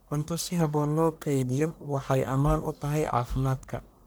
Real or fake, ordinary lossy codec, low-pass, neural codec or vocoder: fake; none; none; codec, 44.1 kHz, 1.7 kbps, Pupu-Codec